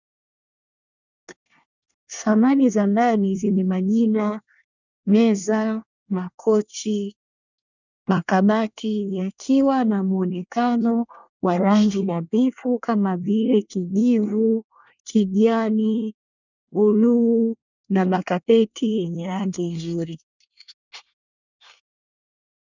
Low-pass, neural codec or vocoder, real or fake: 7.2 kHz; codec, 24 kHz, 1 kbps, SNAC; fake